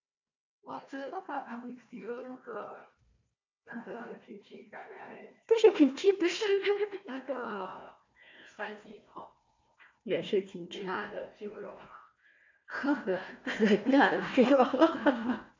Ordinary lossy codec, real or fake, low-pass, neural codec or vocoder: MP3, 64 kbps; fake; 7.2 kHz; codec, 16 kHz, 1 kbps, FunCodec, trained on Chinese and English, 50 frames a second